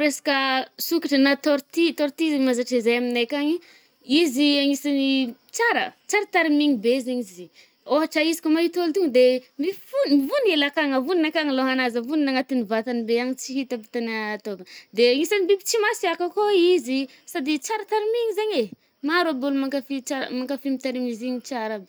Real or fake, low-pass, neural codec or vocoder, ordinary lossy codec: real; none; none; none